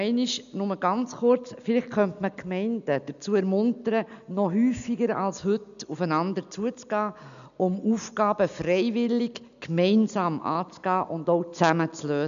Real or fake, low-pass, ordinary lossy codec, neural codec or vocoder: real; 7.2 kHz; none; none